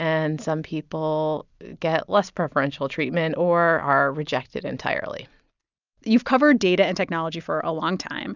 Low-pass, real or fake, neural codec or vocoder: 7.2 kHz; real; none